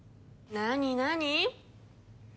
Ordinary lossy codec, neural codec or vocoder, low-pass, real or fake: none; none; none; real